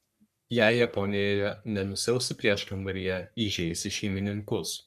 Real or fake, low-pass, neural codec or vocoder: fake; 14.4 kHz; codec, 44.1 kHz, 3.4 kbps, Pupu-Codec